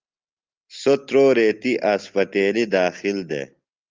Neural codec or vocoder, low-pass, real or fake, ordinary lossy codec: none; 7.2 kHz; real; Opus, 24 kbps